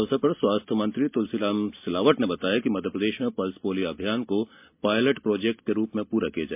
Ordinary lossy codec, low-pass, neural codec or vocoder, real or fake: MP3, 32 kbps; 3.6 kHz; none; real